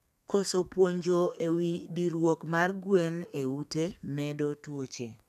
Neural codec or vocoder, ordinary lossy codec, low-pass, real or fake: codec, 32 kHz, 1.9 kbps, SNAC; none; 14.4 kHz; fake